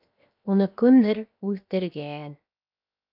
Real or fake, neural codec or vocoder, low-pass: fake; codec, 16 kHz, 0.7 kbps, FocalCodec; 5.4 kHz